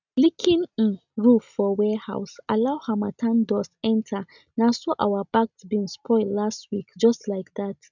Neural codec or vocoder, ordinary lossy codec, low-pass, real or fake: none; none; 7.2 kHz; real